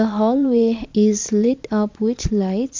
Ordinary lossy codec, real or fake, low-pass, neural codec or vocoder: MP3, 48 kbps; fake; 7.2 kHz; vocoder, 22.05 kHz, 80 mel bands, Vocos